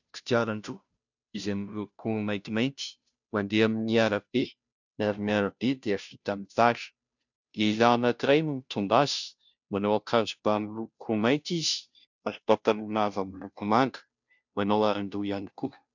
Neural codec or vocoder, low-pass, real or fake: codec, 16 kHz, 0.5 kbps, FunCodec, trained on Chinese and English, 25 frames a second; 7.2 kHz; fake